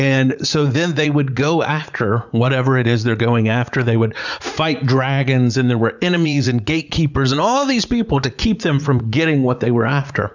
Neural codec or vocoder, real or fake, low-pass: vocoder, 44.1 kHz, 80 mel bands, Vocos; fake; 7.2 kHz